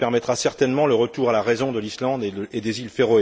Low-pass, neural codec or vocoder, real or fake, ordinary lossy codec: none; none; real; none